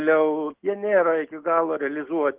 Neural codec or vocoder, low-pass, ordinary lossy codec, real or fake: none; 3.6 kHz; Opus, 16 kbps; real